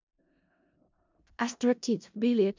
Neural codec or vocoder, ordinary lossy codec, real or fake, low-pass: codec, 16 kHz in and 24 kHz out, 0.4 kbps, LongCat-Audio-Codec, four codebook decoder; MP3, 64 kbps; fake; 7.2 kHz